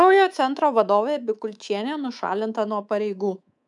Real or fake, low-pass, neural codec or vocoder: fake; 10.8 kHz; codec, 24 kHz, 3.1 kbps, DualCodec